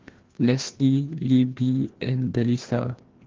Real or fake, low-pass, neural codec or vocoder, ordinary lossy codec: fake; 7.2 kHz; codec, 16 kHz, 1 kbps, FreqCodec, larger model; Opus, 16 kbps